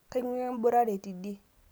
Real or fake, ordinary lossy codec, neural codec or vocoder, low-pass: real; none; none; none